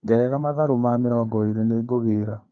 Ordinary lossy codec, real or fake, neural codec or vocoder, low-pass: Opus, 24 kbps; fake; codec, 16 kHz, 4 kbps, FreqCodec, larger model; 7.2 kHz